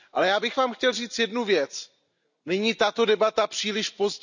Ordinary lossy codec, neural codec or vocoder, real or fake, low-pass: none; none; real; 7.2 kHz